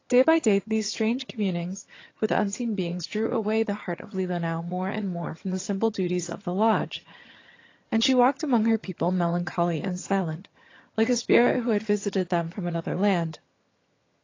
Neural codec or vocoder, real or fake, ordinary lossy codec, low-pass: vocoder, 22.05 kHz, 80 mel bands, HiFi-GAN; fake; AAC, 32 kbps; 7.2 kHz